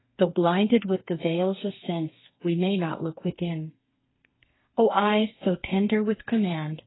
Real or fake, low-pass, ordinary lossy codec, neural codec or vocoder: fake; 7.2 kHz; AAC, 16 kbps; codec, 32 kHz, 1.9 kbps, SNAC